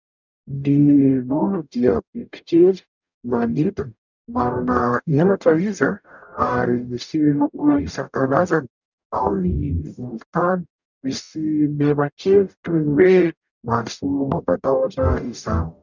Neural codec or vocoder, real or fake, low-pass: codec, 44.1 kHz, 0.9 kbps, DAC; fake; 7.2 kHz